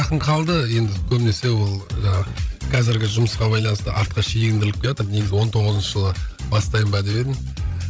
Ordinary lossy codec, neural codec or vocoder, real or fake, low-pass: none; codec, 16 kHz, 16 kbps, FreqCodec, larger model; fake; none